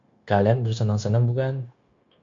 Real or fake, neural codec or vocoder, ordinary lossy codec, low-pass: fake; codec, 16 kHz, 0.9 kbps, LongCat-Audio-Codec; MP3, 48 kbps; 7.2 kHz